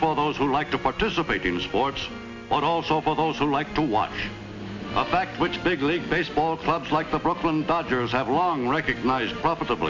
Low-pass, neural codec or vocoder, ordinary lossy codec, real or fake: 7.2 kHz; none; MP3, 48 kbps; real